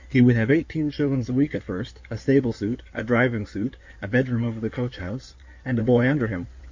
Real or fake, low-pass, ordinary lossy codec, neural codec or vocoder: fake; 7.2 kHz; MP3, 48 kbps; codec, 16 kHz in and 24 kHz out, 2.2 kbps, FireRedTTS-2 codec